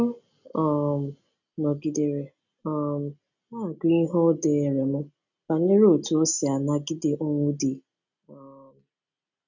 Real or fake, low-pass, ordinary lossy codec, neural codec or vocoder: real; 7.2 kHz; none; none